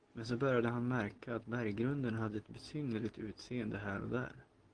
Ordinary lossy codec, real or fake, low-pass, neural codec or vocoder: Opus, 16 kbps; real; 9.9 kHz; none